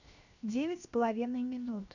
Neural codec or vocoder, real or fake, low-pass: codec, 16 kHz, 0.7 kbps, FocalCodec; fake; 7.2 kHz